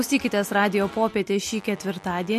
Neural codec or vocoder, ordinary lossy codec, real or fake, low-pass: none; MP3, 64 kbps; real; 14.4 kHz